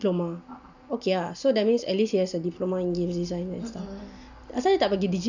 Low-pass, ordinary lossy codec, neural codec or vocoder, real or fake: 7.2 kHz; none; none; real